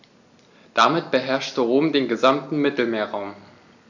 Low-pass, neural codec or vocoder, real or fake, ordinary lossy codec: 7.2 kHz; none; real; none